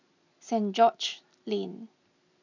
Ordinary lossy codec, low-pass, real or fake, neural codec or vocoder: none; 7.2 kHz; real; none